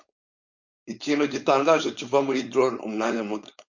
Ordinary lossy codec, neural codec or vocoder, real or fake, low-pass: MP3, 48 kbps; codec, 16 kHz, 4.8 kbps, FACodec; fake; 7.2 kHz